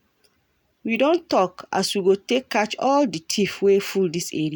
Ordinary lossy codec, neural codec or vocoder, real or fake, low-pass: none; none; real; none